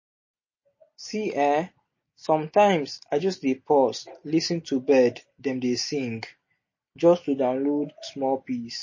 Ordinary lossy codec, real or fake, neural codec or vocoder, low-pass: MP3, 32 kbps; real; none; 7.2 kHz